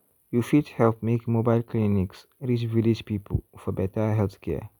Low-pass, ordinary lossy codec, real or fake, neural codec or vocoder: 19.8 kHz; none; real; none